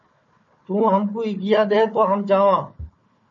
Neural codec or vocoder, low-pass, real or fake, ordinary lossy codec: codec, 16 kHz, 4 kbps, FunCodec, trained on Chinese and English, 50 frames a second; 7.2 kHz; fake; MP3, 32 kbps